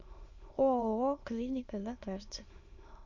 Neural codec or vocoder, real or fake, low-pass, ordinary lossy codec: autoencoder, 22.05 kHz, a latent of 192 numbers a frame, VITS, trained on many speakers; fake; 7.2 kHz; Opus, 64 kbps